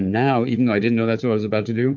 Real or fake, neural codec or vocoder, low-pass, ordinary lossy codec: fake; codec, 16 kHz, 4 kbps, FunCodec, trained on Chinese and English, 50 frames a second; 7.2 kHz; MP3, 64 kbps